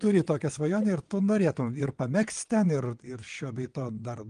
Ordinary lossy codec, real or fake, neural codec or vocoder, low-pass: Opus, 24 kbps; fake; vocoder, 22.05 kHz, 80 mel bands, WaveNeXt; 9.9 kHz